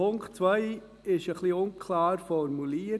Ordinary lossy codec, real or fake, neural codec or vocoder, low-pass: none; real; none; none